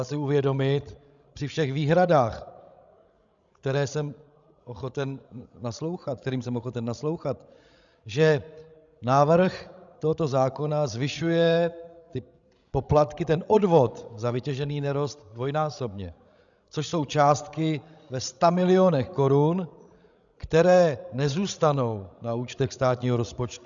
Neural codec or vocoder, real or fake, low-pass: codec, 16 kHz, 16 kbps, FreqCodec, larger model; fake; 7.2 kHz